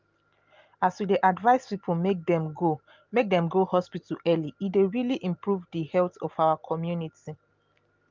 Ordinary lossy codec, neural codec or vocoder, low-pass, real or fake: Opus, 24 kbps; none; 7.2 kHz; real